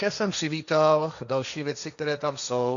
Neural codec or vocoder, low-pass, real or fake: codec, 16 kHz, 1.1 kbps, Voila-Tokenizer; 7.2 kHz; fake